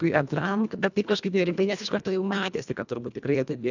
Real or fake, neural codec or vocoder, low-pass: fake; codec, 24 kHz, 1.5 kbps, HILCodec; 7.2 kHz